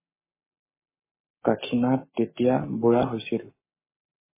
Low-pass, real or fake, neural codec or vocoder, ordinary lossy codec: 3.6 kHz; fake; codec, 44.1 kHz, 7.8 kbps, Pupu-Codec; MP3, 16 kbps